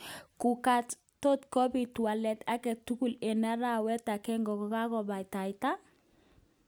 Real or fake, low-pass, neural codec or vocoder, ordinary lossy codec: real; none; none; none